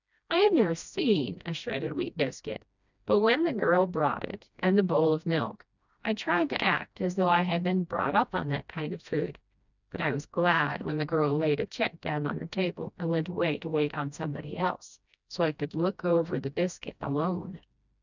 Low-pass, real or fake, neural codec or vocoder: 7.2 kHz; fake; codec, 16 kHz, 1 kbps, FreqCodec, smaller model